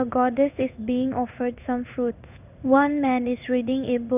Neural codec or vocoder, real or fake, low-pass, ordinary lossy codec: codec, 16 kHz in and 24 kHz out, 1 kbps, XY-Tokenizer; fake; 3.6 kHz; none